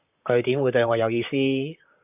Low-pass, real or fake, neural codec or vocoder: 3.6 kHz; fake; vocoder, 44.1 kHz, 128 mel bands, Pupu-Vocoder